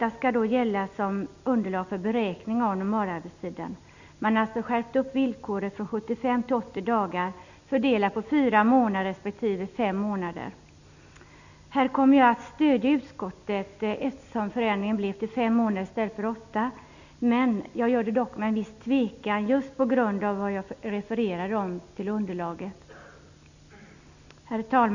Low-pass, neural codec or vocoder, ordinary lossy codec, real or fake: 7.2 kHz; none; none; real